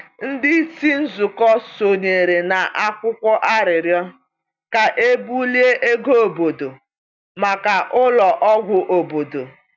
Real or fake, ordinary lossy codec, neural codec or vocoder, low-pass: real; none; none; 7.2 kHz